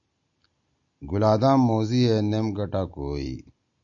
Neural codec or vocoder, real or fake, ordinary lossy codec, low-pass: none; real; MP3, 64 kbps; 7.2 kHz